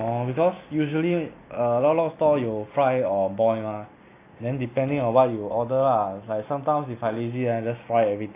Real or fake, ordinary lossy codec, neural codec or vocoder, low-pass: real; AAC, 24 kbps; none; 3.6 kHz